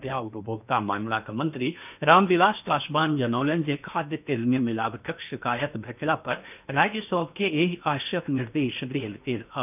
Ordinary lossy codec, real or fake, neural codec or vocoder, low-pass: none; fake; codec, 16 kHz in and 24 kHz out, 0.8 kbps, FocalCodec, streaming, 65536 codes; 3.6 kHz